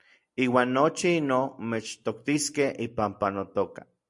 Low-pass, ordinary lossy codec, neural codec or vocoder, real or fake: 10.8 kHz; MP3, 64 kbps; none; real